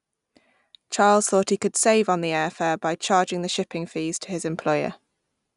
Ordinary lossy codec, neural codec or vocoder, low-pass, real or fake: none; none; 10.8 kHz; real